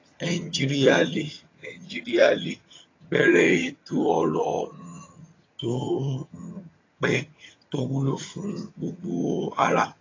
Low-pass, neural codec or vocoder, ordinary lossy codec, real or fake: 7.2 kHz; vocoder, 22.05 kHz, 80 mel bands, HiFi-GAN; AAC, 32 kbps; fake